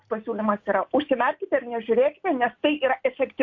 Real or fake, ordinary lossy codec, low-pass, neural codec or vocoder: real; MP3, 48 kbps; 7.2 kHz; none